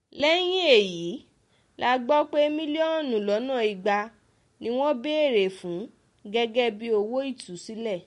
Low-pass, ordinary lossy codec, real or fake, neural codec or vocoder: 14.4 kHz; MP3, 48 kbps; real; none